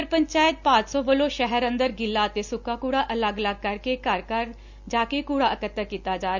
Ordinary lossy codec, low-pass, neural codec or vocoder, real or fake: none; 7.2 kHz; none; real